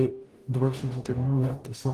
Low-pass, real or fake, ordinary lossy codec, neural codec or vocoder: 14.4 kHz; fake; Opus, 24 kbps; codec, 44.1 kHz, 0.9 kbps, DAC